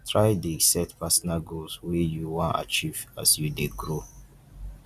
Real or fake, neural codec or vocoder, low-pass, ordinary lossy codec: real; none; 14.4 kHz; Opus, 64 kbps